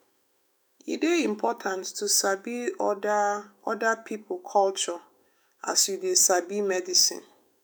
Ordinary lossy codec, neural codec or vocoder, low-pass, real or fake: none; autoencoder, 48 kHz, 128 numbers a frame, DAC-VAE, trained on Japanese speech; none; fake